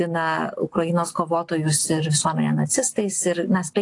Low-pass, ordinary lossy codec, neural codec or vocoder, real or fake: 10.8 kHz; AAC, 48 kbps; none; real